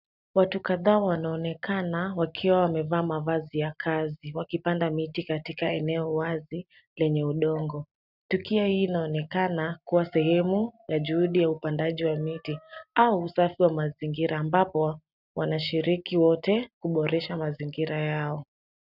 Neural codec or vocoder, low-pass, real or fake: none; 5.4 kHz; real